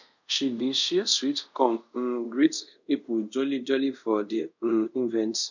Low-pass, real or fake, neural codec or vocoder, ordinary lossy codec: 7.2 kHz; fake; codec, 24 kHz, 0.5 kbps, DualCodec; none